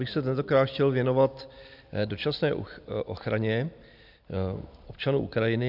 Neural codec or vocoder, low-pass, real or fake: none; 5.4 kHz; real